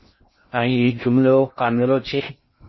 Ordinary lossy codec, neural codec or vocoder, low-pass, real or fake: MP3, 24 kbps; codec, 16 kHz in and 24 kHz out, 0.6 kbps, FocalCodec, streaming, 2048 codes; 7.2 kHz; fake